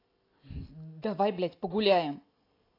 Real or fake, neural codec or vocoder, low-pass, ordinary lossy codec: real; none; 5.4 kHz; AAC, 32 kbps